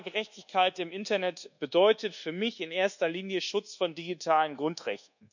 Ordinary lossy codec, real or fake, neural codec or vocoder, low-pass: none; fake; codec, 24 kHz, 1.2 kbps, DualCodec; 7.2 kHz